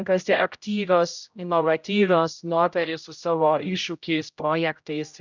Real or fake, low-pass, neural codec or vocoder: fake; 7.2 kHz; codec, 16 kHz, 0.5 kbps, X-Codec, HuBERT features, trained on general audio